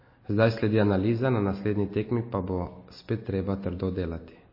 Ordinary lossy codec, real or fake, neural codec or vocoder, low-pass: MP3, 24 kbps; real; none; 5.4 kHz